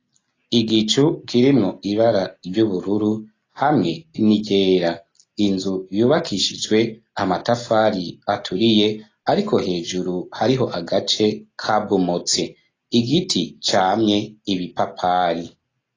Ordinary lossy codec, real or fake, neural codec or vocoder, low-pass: AAC, 32 kbps; real; none; 7.2 kHz